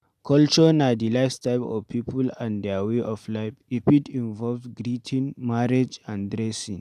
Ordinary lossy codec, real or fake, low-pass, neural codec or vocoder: none; real; 14.4 kHz; none